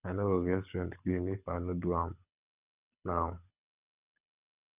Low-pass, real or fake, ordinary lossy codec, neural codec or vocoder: 3.6 kHz; fake; none; codec, 24 kHz, 6 kbps, HILCodec